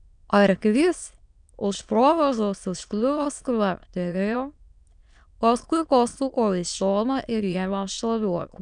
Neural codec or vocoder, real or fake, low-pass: autoencoder, 22.05 kHz, a latent of 192 numbers a frame, VITS, trained on many speakers; fake; 9.9 kHz